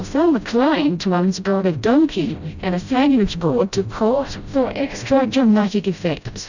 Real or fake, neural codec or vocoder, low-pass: fake; codec, 16 kHz, 0.5 kbps, FreqCodec, smaller model; 7.2 kHz